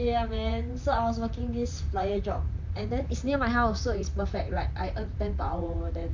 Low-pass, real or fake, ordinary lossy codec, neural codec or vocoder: 7.2 kHz; fake; MP3, 64 kbps; vocoder, 44.1 kHz, 128 mel bands, Pupu-Vocoder